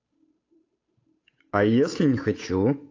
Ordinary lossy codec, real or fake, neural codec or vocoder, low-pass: AAC, 32 kbps; fake; codec, 16 kHz, 8 kbps, FunCodec, trained on Chinese and English, 25 frames a second; 7.2 kHz